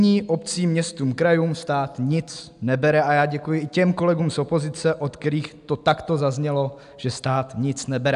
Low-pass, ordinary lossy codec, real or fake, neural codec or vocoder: 10.8 kHz; AAC, 96 kbps; real; none